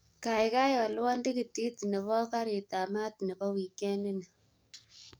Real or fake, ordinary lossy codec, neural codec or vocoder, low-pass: fake; none; codec, 44.1 kHz, 7.8 kbps, DAC; none